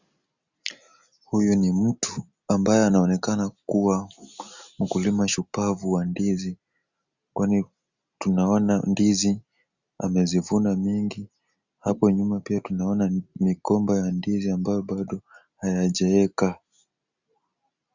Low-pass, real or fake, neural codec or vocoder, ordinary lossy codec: 7.2 kHz; real; none; Opus, 64 kbps